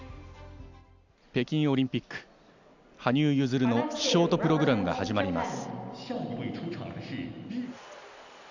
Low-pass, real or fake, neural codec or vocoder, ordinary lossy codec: 7.2 kHz; real; none; none